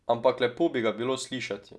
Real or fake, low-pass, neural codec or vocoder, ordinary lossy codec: real; none; none; none